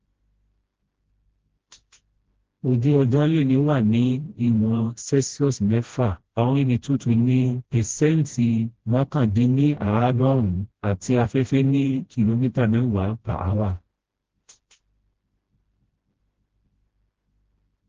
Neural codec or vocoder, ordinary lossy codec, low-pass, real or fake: codec, 16 kHz, 1 kbps, FreqCodec, smaller model; Opus, 16 kbps; 7.2 kHz; fake